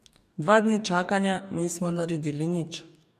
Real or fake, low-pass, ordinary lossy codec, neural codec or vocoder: fake; 14.4 kHz; MP3, 96 kbps; codec, 44.1 kHz, 2.6 kbps, DAC